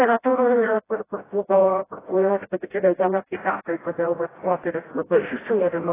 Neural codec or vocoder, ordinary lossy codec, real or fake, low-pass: codec, 16 kHz, 0.5 kbps, FreqCodec, smaller model; AAC, 16 kbps; fake; 3.6 kHz